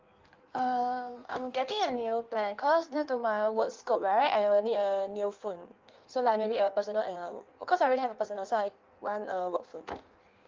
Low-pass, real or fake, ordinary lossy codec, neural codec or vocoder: 7.2 kHz; fake; Opus, 32 kbps; codec, 16 kHz in and 24 kHz out, 1.1 kbps, FireRedTTS-2 codec